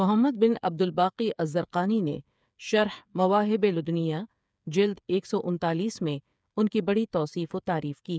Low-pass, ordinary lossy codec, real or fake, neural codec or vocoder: none; none; fake; codec, 16 kHz, 8 kbps, FreqCodec, smaller model